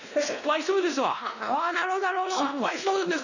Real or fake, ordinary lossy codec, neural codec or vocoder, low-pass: fake; none; codec, 16 kHz, 1 kbps, X-Codec, WavLM features, trained on Multilingual LibriSpeech; 7.2 kHz